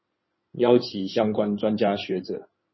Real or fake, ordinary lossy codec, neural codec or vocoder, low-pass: fake; MP3, 24 kbps; codec, 16 kHz in and 24 kHz out, 2.2 kbps, FireRedTTS-2 codec; 7.2 kHz